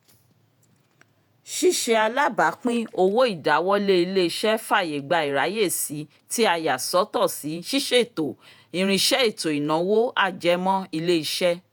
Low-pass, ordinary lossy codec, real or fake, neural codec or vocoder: none; none; fake; vocoder, 48 kHz, 128 mel bands, Vocos